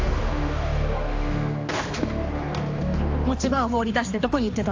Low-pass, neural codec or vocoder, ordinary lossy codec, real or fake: 7.2 kHz; codec, 16 kHz, 2 kbps, X-Codec, HuBERT features, trained on general audio; AAC, 48 kbps; fake